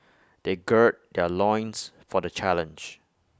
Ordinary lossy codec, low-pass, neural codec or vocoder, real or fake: none; none; none; real